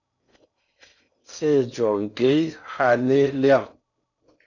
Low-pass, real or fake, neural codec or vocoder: 7.2 kHz; fake; codec, 16 kHz in and 24 kHz out, 0.6 kbps, FocalCodec, streaming, 4096 codes